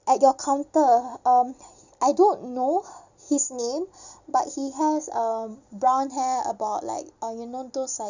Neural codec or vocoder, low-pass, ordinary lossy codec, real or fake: none; 7.2 kHz; none; real